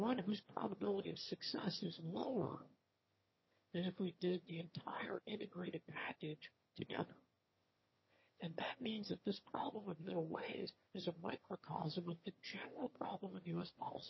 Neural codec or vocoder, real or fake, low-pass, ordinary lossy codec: autoencoder, 22.05 kHz, a latent of 192 numbers a frame, VITS, trained on one speaker; fake; 7.2 kHz; MP3, 24 kbps